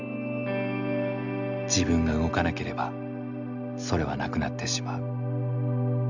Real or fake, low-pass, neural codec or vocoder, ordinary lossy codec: real; 7.2 kHz; none; none